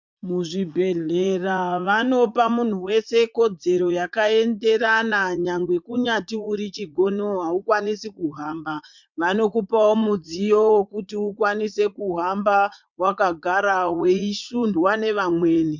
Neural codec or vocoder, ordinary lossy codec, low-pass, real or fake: vocoder, 44.1 kHz, 80 mel bands, Vocos; MP3, 64 kbps; 7.2 kHz; fake